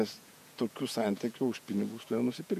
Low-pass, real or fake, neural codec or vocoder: 14.4 kHz; fake; vocoder, 48 kHz, 128 mel bands, Vocos